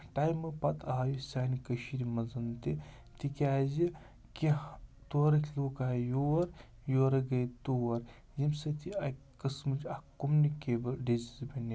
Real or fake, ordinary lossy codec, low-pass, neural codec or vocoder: real; none; none; none